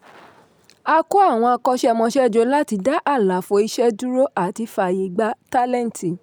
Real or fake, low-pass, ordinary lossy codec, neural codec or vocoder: real; none; none; none